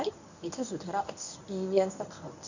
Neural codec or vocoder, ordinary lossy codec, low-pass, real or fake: codec, 24 kHz, 0.9 kbps, WavTokenizer, medium speech release version 1; none; 7.2 kHz; fake